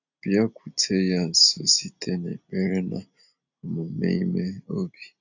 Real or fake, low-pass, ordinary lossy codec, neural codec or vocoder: real; 7.2 kHz; none; none